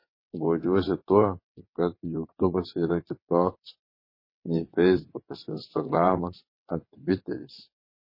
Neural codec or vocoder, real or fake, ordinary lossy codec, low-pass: vocoder, 22.05 kHz, 80 mel bands, WaveNeXt; fake; MP3, 24 kbps; 5.4 kHz